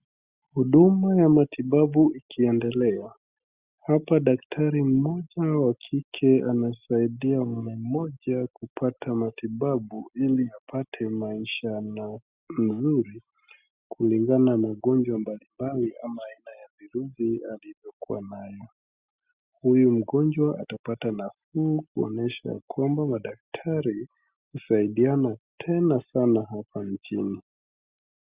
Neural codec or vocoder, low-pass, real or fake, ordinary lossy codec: none; 3.6 kHz; real; Opus, 64 kbps